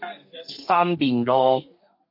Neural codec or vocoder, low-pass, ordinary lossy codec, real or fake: codec, 32 kHz, 1.9 kbps, SNAC; 5.4 kHz; MP3, 32 kbps; fake